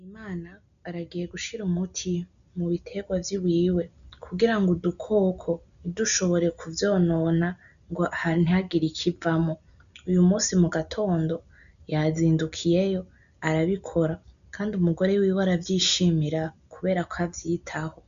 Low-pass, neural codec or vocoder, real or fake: 7.2 kHz; none; real